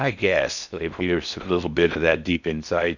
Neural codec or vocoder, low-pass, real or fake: codec, 16 kHz in and 24 kHz out, 0.6 kbps, FocalCodec, streaming, 4096 codes; 7.2 kHz; fake